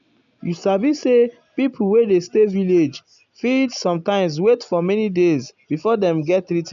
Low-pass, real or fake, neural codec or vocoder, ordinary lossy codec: 7.2 kHz; real; none; none